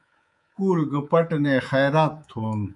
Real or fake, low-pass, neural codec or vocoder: fake; 10.8 kHz; codec, 24 kHz, 3.1 kbps, DualCodec